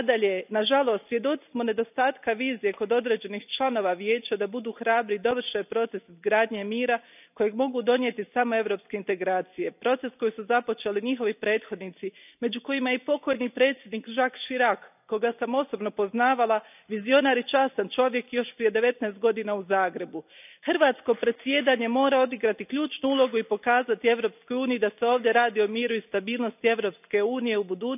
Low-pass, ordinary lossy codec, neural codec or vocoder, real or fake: 3.6 kHz; none; none; real